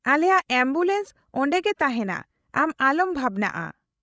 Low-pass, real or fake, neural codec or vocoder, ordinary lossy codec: none; fake; codec, 16 kHz, 16 kbps, FreqCodec, larger model; none